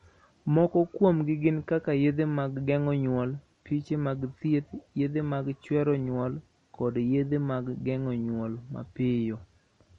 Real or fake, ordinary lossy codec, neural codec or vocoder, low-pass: real; MP3, 48 kbps; none; 19.8 kHz